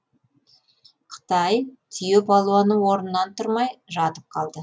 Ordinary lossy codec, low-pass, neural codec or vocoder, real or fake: none; none; none; real